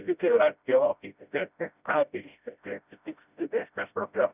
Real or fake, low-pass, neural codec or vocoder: fake; 3.6 kHz; codec, 16 kHz, 0.5 kbps, FreqCodec, smaller model